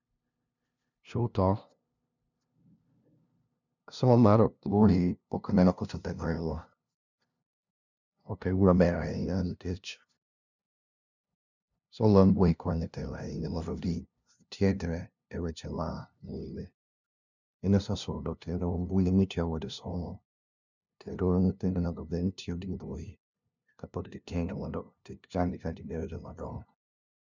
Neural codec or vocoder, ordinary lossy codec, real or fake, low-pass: codec, 16 kHz, 0.5 kbps, FunCodec, trained on LibriTTS, 25 frames a second; none; fake; 7.2 kHz